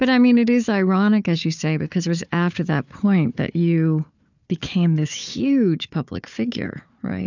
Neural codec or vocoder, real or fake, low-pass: codec, 16 kHz, 4 kbps, FunCodec, trained on Chinese and English, 50 frames a second; fake; 7.2 kHz